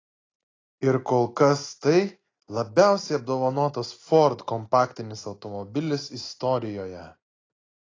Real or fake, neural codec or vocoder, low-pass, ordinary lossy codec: real; none; 7.2 kHz; AAC, 32 kbps